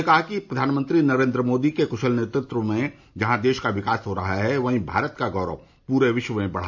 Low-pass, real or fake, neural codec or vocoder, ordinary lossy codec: 7.2 kHz; real; none; MP3, 64 kbps